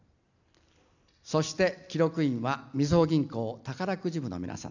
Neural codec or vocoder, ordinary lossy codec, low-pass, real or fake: none; none; 7.2 kHz; real